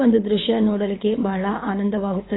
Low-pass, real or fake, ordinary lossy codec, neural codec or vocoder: 7.2 kHz; fake; AAC, 16 kbps; codec, 24 kHz, 6 kbps, HILCodec